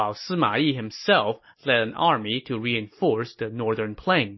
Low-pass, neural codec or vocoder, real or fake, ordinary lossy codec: 7.2 kHz; none; real; MP3, 24 kbps